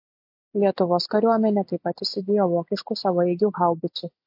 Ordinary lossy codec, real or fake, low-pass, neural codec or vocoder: MP3, 32 kbps; real; 5.4 kHz; none